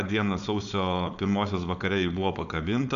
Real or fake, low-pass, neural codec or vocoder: fake; 7.2 kHz; codec, 16 kHz, 4.8 kbps, FACodec